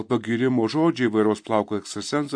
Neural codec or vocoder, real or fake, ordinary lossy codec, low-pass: none; real; MP3, 48 kbps; 9.9 kHz